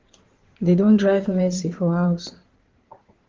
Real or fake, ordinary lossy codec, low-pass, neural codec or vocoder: fake; Opus, 16 kbps; 7.2 kHz; codec, 16 kHz in and 24 kHz out, 2.2 kbps, FireRedTTS-2 codec